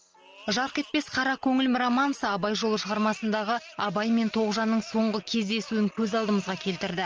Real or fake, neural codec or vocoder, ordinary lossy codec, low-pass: real; none; Opus, 24 kbps; 7.2 kHz